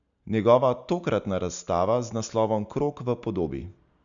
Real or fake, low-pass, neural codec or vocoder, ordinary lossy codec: real; 7.2 kHz; none; none